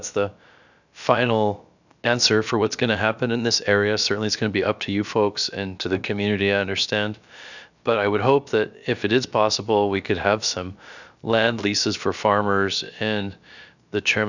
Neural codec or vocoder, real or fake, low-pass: codec, 16 kHz, about 1 kbps, DyCAST, with the encoder's durations; fake; 7.2 kHz